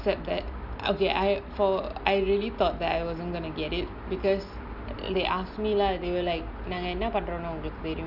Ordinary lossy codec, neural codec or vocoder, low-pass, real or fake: none; none; 5.4 kHz; real